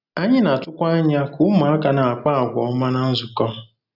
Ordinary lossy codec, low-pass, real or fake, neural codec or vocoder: none; 5.4 kHz; real; none